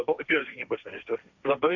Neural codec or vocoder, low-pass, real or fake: codec, 16 kHz, 1.1 kbps, Voila-Tokenizer; 7.2 kHz; fake